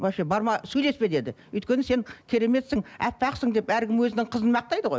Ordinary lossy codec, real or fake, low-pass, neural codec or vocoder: none; real; none; none